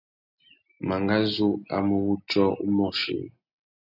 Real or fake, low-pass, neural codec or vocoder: real; 5.4 kHz; none